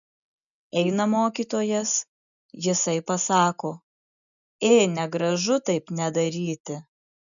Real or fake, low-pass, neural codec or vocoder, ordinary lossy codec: real; 7.2 kHz; none; MP3, 96 kbps